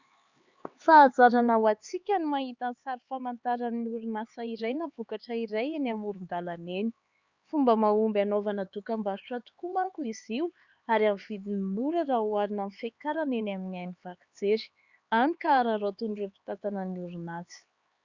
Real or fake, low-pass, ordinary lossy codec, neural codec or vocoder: fake; 7.2 kHz; Opus, 64 kbps; codec, 16 kHz, 4 kbps, X-Codec, HuBERT features, trained on LibriSpeech